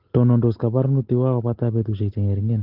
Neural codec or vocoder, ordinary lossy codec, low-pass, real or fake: none; Opus, 16 kbps; 5.4 kHz; real